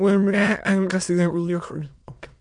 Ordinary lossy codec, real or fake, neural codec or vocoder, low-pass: MP3, 64 kbps; fake; autoencoder, 22.05 kHz, a latent of 192 numbers a frame, VITS, trained on many speakers; 9.9 kHz